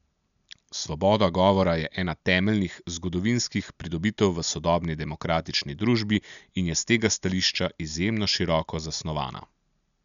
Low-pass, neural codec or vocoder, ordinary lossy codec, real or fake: 7.2 kHz; none; none; real